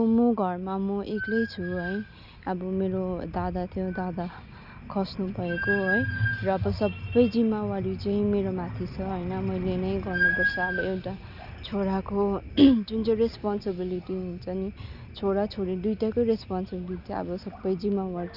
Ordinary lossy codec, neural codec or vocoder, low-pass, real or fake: none; none; 5.4 kHz; real